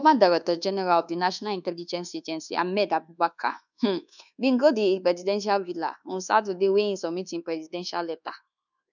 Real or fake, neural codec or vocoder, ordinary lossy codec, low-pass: fake; codec, 24 kHz, 1.2 kbps, DualCodec; none; 7.2 kHz